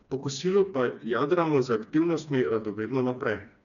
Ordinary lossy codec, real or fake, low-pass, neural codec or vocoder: none; fake; 7.2 kHz; codec, 16 kHz, 2 kbps, FreqCodec, smaller model